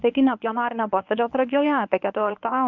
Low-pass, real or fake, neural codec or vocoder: 7.2 kHz; fake; codec, 24 kHz, 0.9 kbps, WavTokenizer, medium speech release version 1